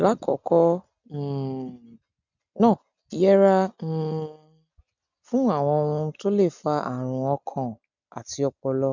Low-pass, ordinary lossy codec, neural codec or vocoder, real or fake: 7.2 kHz; none; none; real